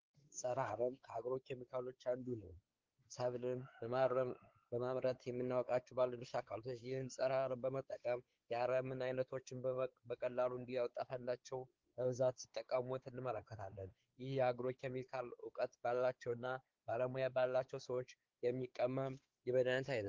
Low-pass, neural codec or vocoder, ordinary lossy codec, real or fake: 7.2 kHz; codec, 16 kHz, 4 kbps, X-Codec, WavLM features, trained on Multilingual LibriSpeech; Opus, 16 kbps; fake